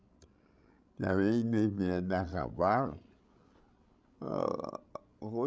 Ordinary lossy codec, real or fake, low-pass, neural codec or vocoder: none; fake; none; codec, 16 kHz, 16 kbps, FreqCodec, larger model